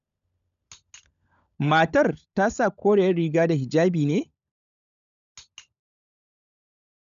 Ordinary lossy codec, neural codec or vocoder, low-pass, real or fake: none; codec, 16 kHz, 16 kbps, FunCodec, trained on LibriTTS, 50 frames a second; 7.2 kHz; fake